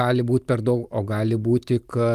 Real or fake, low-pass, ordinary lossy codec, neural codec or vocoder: real; 14.4 kHz; Opus, 32 kbps; none